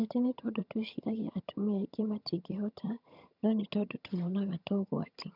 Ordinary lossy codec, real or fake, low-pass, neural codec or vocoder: none; fake; 5.4 kHz; vocoder, 22.05 kHz, 80 mel bands, HiFi-GAN